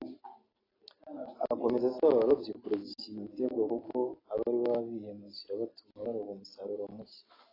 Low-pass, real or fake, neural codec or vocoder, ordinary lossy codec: 5.4 kHz; real; none; AAC, 24 kbps